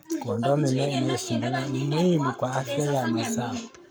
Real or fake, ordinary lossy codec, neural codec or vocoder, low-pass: fake; none; codec, 44.1 kHz, 7.8 kbps, Pupu-Codec; none